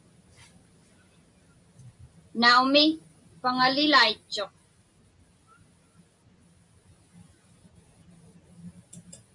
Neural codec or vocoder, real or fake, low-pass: none; real; 10.8 kHz